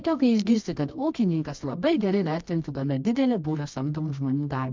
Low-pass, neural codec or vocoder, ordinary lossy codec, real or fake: 7.2 kHz; codec, 24 kHz, 0.9 kbps, WavTokenizer, medium music audio release; MP3, 64 kbps; fake